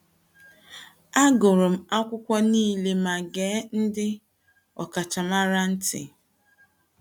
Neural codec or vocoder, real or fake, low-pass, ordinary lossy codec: none; real; 19.8 kHz; none